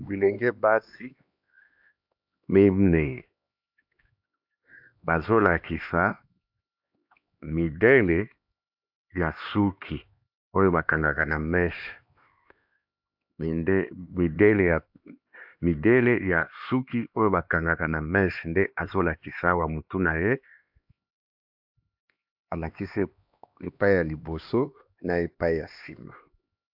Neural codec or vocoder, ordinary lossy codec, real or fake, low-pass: codec, 16 kHz, 2 kbps, X-Codec, HuBERT features, trained on LibriSpeech; AAC, 48 kbps; fake; 5.4 kHz